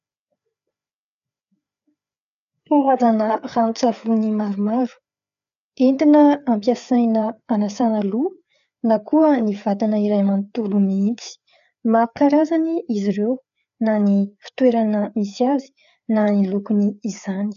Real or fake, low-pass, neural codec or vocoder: fake; 7.2 kHz; codec, 16 kHz, 4 kbps, FreqCodec, larger model